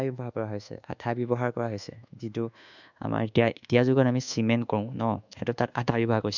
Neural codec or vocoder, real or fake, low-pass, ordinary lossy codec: codec, 24 kHz, 1.2 kbps, DualCodec; fake; 7.2 kHz; none